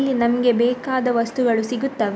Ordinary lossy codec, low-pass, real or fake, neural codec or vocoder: none; none; real; none